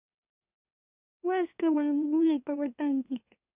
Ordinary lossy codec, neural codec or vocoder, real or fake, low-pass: Opus, 64 kbps; autoencoder, 44.1 kHz, a latent of 192 numbers a frame, MeloTTS; fake; 3.6 kHz